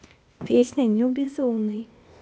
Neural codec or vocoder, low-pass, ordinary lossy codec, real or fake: codec, 16 kHz, 0.8 kbps, ZipCodec; none; none; fake